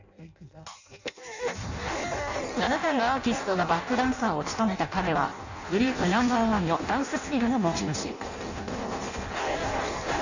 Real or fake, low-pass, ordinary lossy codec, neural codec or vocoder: fake; 7.2 kHz; none; codec, 16 kHz in and 24 kHz out, 0.6 kbps, FireRedTTS-2 codec